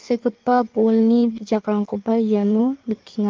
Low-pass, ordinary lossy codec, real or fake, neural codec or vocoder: 7.2 kHz; Opus, 32 kbps; fake; codec, 16 kHz in and 24 kHz out, 1.1 kbps, FireRedTTS-2 codec